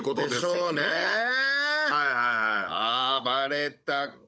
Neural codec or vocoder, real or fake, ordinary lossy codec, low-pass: codec, 16 kHz, 16 kbps, FunCodec, trained on Chinese and English, 50 frames a second; fake; none; none